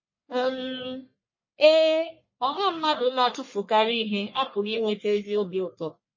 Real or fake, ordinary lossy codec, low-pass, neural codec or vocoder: fake; MP3, 32 kbps; 7.2 kHz; codec, 44.1 kHz, 1.7 kbps, Pupu-Codec